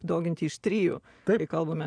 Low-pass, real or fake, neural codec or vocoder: 9.9 kHz; fake; vocoder, 24 kHz, 100 mel bands, Vocos